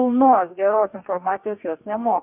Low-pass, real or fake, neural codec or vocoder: 3.6 kHz; fake; codec, 44.1 kHz, 2.6 kbps, DAC